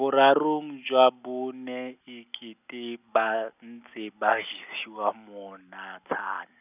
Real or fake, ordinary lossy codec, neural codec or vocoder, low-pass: real; none; none; 3.6 kHz